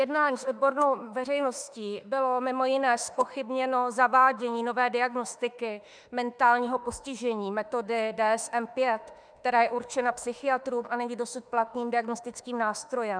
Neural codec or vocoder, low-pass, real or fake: autoencoder, 48 kHz, 32 numbers a frame, DAC-VAE, trained on Japanese speech; 9.9 kHz; fake